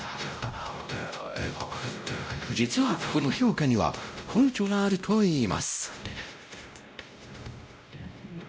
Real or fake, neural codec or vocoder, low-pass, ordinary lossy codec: fake; codec, 16 kHz, 0.5 kbps, X-Codec, WavLM features, trained on Multilingual LibriSpeech; none; none